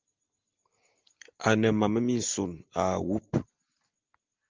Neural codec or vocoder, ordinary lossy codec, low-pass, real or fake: none; Opus, 32 kbps; 7.2 kHz; real